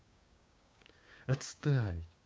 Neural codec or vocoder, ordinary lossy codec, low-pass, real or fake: codec, 16 kHz, 6 kbps, DAC; none; none; fake